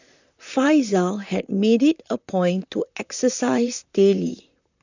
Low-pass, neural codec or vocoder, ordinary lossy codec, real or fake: 7.2 kHz; vocoder, 44.1 kHz, 128 mel bands, Pupu-Vocoder; none; fake